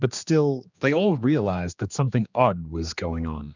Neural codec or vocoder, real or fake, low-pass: codec, 16 kHz, 2 kbps, X-Codec, HuBERT features, trained on general audio; fake; 7.2 kHz